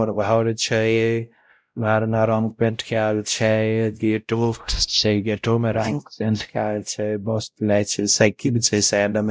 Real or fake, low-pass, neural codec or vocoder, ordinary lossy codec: fake; none; codec, 16 kHz, 0.5 kbps, X-Codec, WavLM features, trained on Multilingual LibriSpeech; none